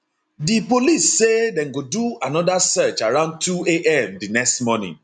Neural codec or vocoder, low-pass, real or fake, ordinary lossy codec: none; 9.9 kHz; real; none